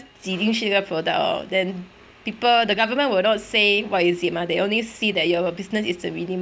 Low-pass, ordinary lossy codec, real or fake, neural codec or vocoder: none; none; real; none